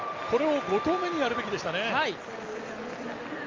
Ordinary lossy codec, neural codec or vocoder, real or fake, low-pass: Opus, 32 kbps; none; real; 7.2 kHz